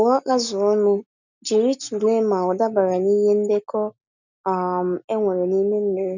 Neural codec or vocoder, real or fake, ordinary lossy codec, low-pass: none; real; none; 7.2 kHz